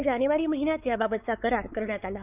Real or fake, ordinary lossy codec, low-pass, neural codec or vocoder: fake; none; 3.6 kHz; codec, 16 kHz, 4 kbps, X-Codec, WavLM features, trained on Multilingual LibriSpeech